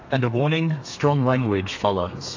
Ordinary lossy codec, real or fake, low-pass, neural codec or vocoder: AAC, 48 kbps; fake; 7.2 kHz; codec, 32 kHz, 1.9 kbps, SNAC